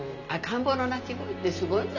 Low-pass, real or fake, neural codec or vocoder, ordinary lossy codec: 7.2 kHz; real; none; none